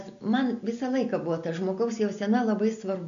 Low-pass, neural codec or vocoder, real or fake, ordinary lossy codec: 7.2 kHz; none; real; AAC, 96 kbps